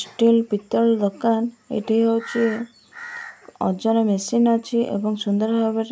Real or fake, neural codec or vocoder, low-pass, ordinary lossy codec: real; none; none; none